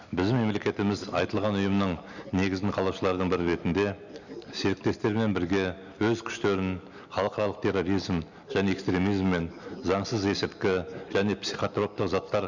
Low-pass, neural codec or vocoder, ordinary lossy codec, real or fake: 7.2 kHz; none; none; real